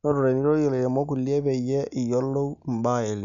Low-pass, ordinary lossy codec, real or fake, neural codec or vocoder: 7.2 kHz; none; real; none